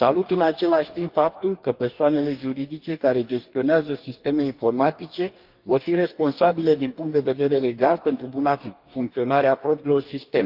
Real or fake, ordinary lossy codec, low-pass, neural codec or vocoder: fake; Opus, 24 kbps; 5.4 kHz; codec, 44.1 kHz, 2.6 kbps, DAC